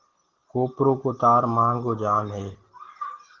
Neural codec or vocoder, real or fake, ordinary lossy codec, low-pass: none; real; Opus, 16 kbps; 7.2 kHz